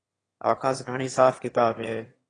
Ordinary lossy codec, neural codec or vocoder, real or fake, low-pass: AAC, 32 kbps; autoencoder, 22.05 kHz, a latent of 192 numbers a frame, VITS, trained on one speaker; fake; 9.9 kHz